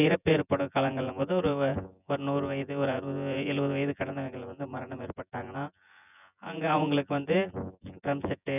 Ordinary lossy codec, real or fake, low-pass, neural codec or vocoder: none; fake; 3.6 kHz; vocoder, 24 kHz, 100 mel bands, Vocos